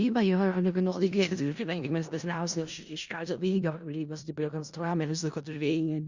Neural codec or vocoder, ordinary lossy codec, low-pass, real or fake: codec, 16 kHz in and 24 kHz out, 0.4 kbps, LongCat-Audio-Codec, four codebook decoder; Opus, 64 kbps; 7.2 kHz; fake